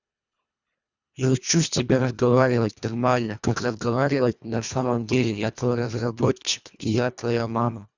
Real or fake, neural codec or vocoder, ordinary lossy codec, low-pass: fake; codec, 24 kHz, 1.5 kbps, HILCodec; Opus, 64 kbps; 7.2 kHz